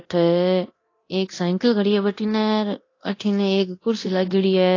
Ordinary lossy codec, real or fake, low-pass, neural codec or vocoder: AAC, 32 kbps; fake; 7.2 kHz; codec, 24 kHz, 0.9 kbps, DualCodec